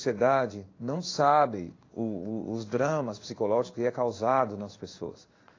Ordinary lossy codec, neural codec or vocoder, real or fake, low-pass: AAC, 32 kbps; codec, 16 kHz in and 24 kHz out, 1 kbps, XY-Tokenizer; fake; 7.2 kHz